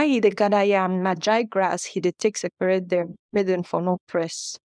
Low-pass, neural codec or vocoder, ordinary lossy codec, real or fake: 9.9 kHz; codec, 24 kHz, 0.9 kbps, WavTokenizer, small release; none; fake